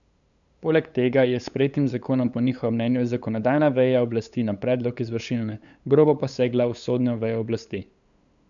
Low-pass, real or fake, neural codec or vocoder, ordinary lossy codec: 7.2 kHz; fake; codec, 16 kHz, 8 kbps, FunCodec, trained on LibriTTS, 25 frames a second; none